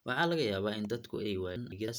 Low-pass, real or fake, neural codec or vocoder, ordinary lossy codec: none; real; none; none